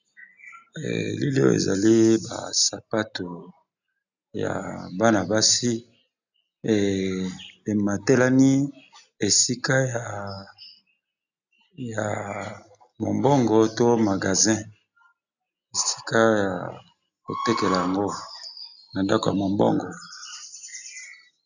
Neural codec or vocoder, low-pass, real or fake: none; 7.2 kHz; real